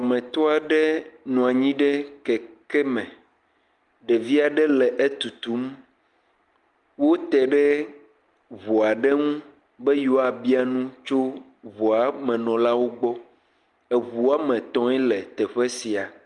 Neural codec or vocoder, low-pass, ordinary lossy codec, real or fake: none; 10.8 kHz; Opus, 32 kbps; real